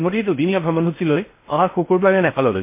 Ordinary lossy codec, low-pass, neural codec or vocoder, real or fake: MP3, 24 kbps; 3.6 kHz; codec, 16 kHz in and 24 kHz out, 0.6 kbps, FocalCodec, streaming, 4096 codes; fake